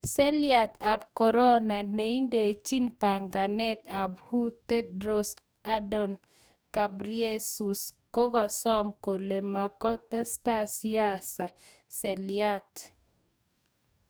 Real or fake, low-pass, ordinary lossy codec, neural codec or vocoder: fake; none; none; codec, 44.1 kHz, 2.6 kbps, DAC